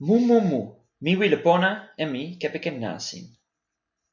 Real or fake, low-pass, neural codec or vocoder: real; 7.2 kHz; none